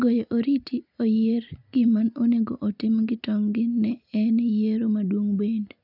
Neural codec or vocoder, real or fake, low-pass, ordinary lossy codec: none; real; 5.4 kHz; none